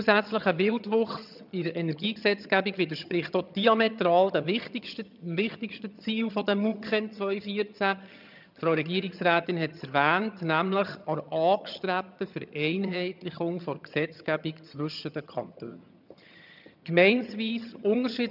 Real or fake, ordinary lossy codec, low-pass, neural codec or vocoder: fake; none; 5.4 kHz; vocoder, 22.05 kHz, 80 mel bands, HiFi-GAN